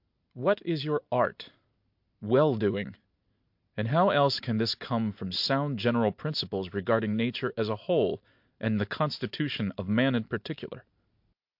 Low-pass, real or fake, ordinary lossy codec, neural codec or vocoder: 5.4 kHz; real; MP3, 48 kbps; none